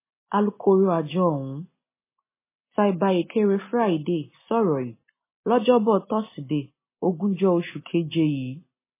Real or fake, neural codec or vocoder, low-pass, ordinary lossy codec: real; none; 3.6 kHz; MP3, 16 kbps